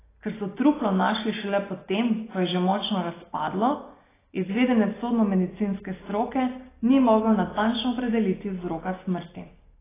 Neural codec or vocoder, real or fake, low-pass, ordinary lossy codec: none; real; 3.6 kHz; AAC, 16 kbps